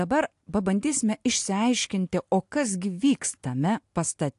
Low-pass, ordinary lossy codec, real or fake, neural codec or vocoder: 10.8 kHz; AAC, 64 kbps; real; none